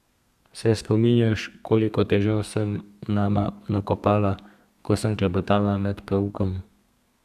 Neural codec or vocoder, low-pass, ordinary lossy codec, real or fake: codec, 32 kHz, 1.9 kbps, SNAC; 14.4 kHz; none; fake